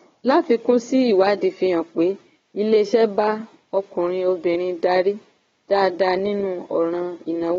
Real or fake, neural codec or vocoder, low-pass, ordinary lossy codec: fake; codec, 16 kHz, 4 kbps, FunCodec, trained on Chinese and English, 50 frames a second; 7.2 kHz; AAC, 24 kbps